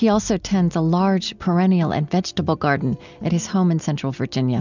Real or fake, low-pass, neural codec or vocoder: real; 7.2 kHz; none